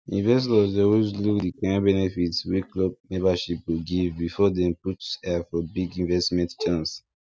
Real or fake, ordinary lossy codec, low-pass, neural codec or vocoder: real; none; none; none